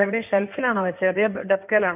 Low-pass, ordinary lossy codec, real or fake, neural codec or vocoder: 3.6 kHz; none; fake; codec, 16 kHz in and 24 kHz out, 2.2 kbps, FireRedTTS-2 codec